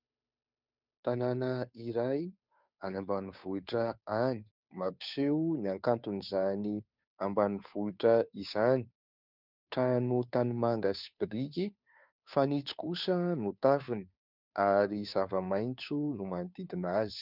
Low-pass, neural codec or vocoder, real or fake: 5.4 kHz; codec, 16 kHz, 2 kbps, FunCodec, trained on Chinese and English, 25 frames a second; fake